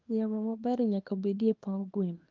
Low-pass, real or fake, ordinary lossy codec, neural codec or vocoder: 7.2 kHz; fake; Opus, 32 kbps; codec, 24 kHz, 0.9 kbps, WavTokenizer, small release